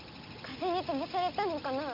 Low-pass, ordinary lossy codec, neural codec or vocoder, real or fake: 5.4 kHz; MP3, 48 kbps; none; real